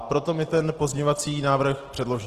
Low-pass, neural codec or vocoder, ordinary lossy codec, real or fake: 14.4 kHz; none; Opus, 16 kbps; real